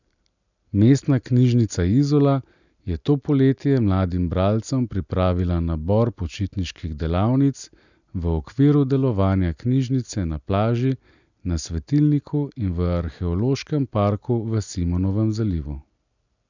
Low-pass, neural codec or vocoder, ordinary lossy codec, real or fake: 7.2 kHz; none; none; real